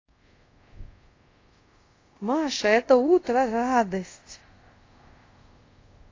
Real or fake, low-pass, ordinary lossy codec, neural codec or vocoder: fake; 7.2 kHz; AAC, 32 kbps; codec, 24 kHz, 0.5 kbps, DualCodec